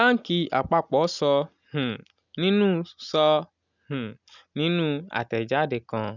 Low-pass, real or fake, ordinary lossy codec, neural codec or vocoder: 7.2 kHz; real; none; none